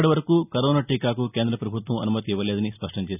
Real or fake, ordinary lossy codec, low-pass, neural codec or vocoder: real; none; 3.6 kHz; none